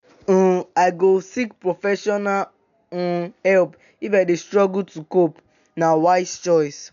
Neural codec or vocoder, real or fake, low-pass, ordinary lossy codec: none; real; 7.2 kHz; none